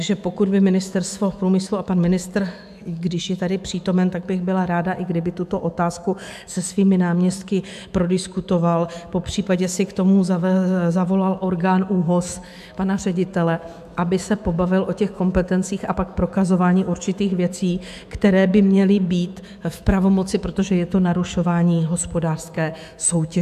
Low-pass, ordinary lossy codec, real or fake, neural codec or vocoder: 14.4 kHz; AAC, 96 kbps; fake; autoencoder, 48 kHz, 128 numbers a frame, DAC-VAE, trained on Japanese speech